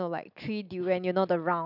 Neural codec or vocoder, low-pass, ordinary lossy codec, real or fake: none; 5.4 kHz; none; real